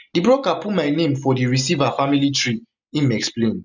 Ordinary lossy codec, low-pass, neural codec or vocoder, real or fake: none; 7.2 kHz; none; real